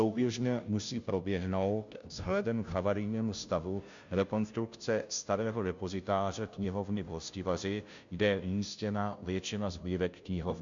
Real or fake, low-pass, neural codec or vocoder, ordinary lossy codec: fake; 7.2 kHz; codec, 16 kHz, 0.5 kbps, FunCodec, trained on Chinese and English, 25 frames a second; MP3, 64 kbps